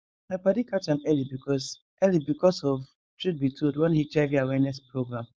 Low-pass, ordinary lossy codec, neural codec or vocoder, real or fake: none; none; codec, 16 kHz, 4.8 kbps, FACodec; fake